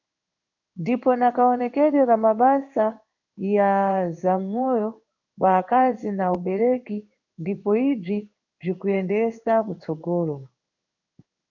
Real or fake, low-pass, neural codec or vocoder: fake; 7.2 kHz; codec, 16 kHz in and 24 kHz out, 1 kbps, XY-Tokenizer